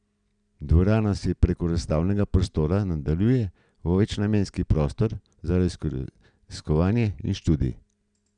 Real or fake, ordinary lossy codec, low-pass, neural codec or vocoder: real; none; 9.9 kHz; none